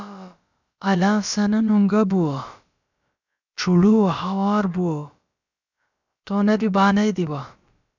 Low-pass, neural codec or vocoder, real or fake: 7.2 kHz; codec, 16 kHz, about 1 kbps, DyCAST, with the encoder's durations; fake